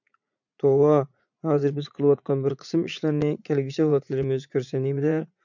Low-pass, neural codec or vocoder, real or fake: 7.2 kHz; vocoder, 44.1 kHz, 80 mel bands, Vocos; fake